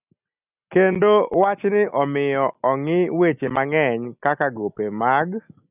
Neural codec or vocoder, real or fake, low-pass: none; real; 3.6 kHz